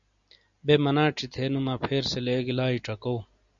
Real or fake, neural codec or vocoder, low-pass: real; none; 7.2 kHz